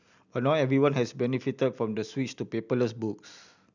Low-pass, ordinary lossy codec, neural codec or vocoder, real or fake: 7.2 kHz; none; none; real